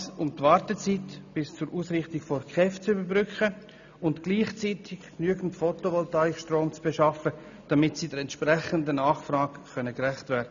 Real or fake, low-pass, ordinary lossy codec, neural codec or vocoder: real; 7.2 kHz; none; none